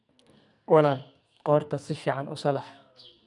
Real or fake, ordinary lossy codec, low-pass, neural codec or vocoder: fake; none; 10.8 kHz; codec, 44.1 kHz, 2.6 kbps, SNAC